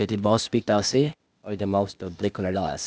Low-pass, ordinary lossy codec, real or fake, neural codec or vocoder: none; none; fake; codec, 16 kHz, 0.8 kbps, ZipCodec